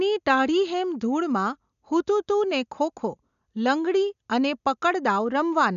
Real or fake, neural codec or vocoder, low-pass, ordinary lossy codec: real; none; 7.2 kHz; none